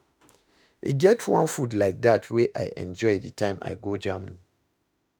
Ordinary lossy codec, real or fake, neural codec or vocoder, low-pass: none; fake; autoencoder, 48 kHz, 32 numbers a frame, DAC-VAE, trained on Japanese speech; none